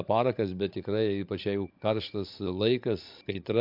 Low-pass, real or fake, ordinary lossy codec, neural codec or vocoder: 5.4 kHz; fake; MP3, 48 kbps; codec, 16 kHz, 16 kbps, FreqCodec, larger model